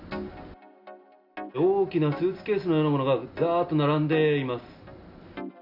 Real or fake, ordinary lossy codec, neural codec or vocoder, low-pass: real; none; none; 5.4 kHz